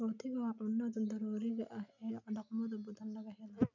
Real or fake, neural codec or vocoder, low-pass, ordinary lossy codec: real; none; 7.2 kHz; none